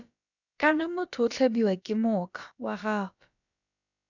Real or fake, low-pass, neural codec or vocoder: fake; 7.2 kHz; codec, 16 kHz, about 1 kbps, DyCAST, with the encoder's durations